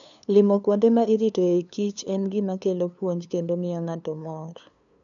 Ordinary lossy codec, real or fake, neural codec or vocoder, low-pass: none; fake; codec, 16 kHz, 2 kbps, FunCodec, trained on LibriTTS, 25 frames a second; 7.2 kHz